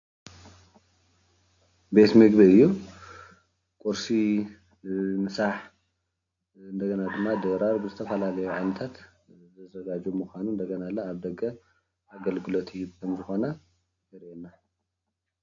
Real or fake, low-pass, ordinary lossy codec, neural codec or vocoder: real; 7.2 kHz; AAC, 48 kbps; none